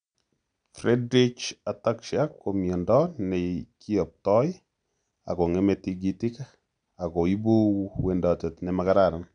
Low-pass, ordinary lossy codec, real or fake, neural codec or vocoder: 9.9 kHz; none; real; none